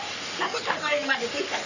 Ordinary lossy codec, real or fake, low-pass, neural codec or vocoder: none; fake; 7.2 kHz; codec, 44.1 kHz, 3.4 kbps, Pupu-Codec